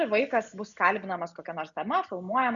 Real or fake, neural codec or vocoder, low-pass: real; none; 7.2 kHz